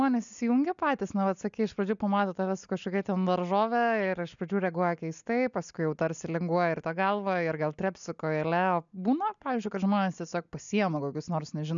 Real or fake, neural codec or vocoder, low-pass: real; none; 7.2 kHz